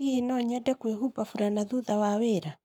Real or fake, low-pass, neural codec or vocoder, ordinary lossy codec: fake; 19.8 kHz; codec, 44.1 kHz, 7.8 kbps, Pupu-Codec; none